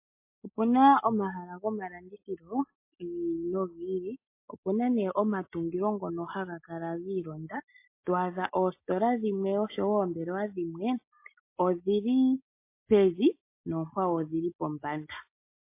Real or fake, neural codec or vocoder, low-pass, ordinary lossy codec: real; none; 3.6 kHz; MP3, 24 kbps